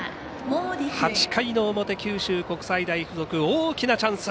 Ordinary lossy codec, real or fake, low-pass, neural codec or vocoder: none; real; none; none